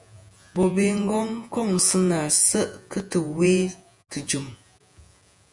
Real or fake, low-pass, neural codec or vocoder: fake; 10.8 kHz; vocoder, 48 kHz, 128 mel bands, Vocos